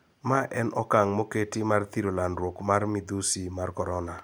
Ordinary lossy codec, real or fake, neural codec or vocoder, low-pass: none; real; none; none